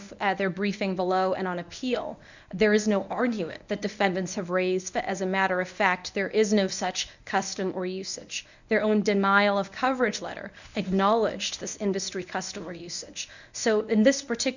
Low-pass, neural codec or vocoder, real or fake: 7.2 kHz; codec, 24 kHz, 0.9 kbps, WavTokenizer, medium speech release version 1; fake